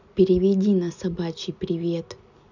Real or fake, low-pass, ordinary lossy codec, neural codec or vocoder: real; 7.2 kHz; none; none